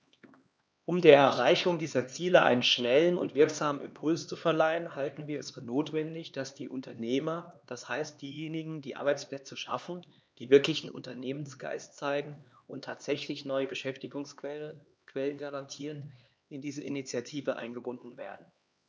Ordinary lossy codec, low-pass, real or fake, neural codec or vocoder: none; none; fake; codec, 16 kHz, 2 kbps, X-Codec, HuBERT features, trained on LibriSpeech